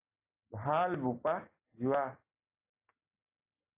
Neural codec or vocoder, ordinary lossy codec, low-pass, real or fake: none; AAC, 24 kbps; 3.6 kHz; real